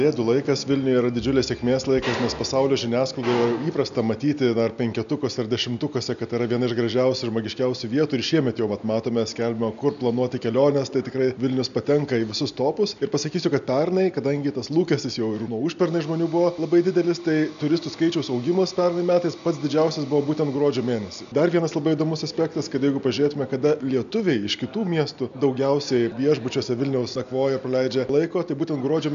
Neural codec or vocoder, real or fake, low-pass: none; real; 7.2 kHz